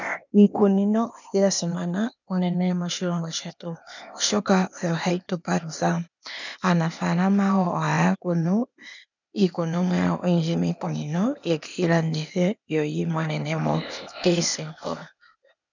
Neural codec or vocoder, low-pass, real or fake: codec, 16 kHz, 0.8 kbps, ZipCodec; 7.2 kHz; fake